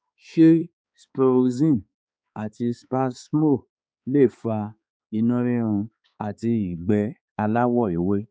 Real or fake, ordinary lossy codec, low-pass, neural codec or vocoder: fake; none; none; codec, 16 kHz, 4 kbps, X-Codec, HuBERT features, trained on balanced general audio